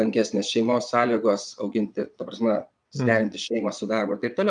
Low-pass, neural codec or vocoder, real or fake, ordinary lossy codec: 9.9 kHz; vocoder, 22.05 kHz, 80 mel bands, Vocos; fake; MP3, 96 kbps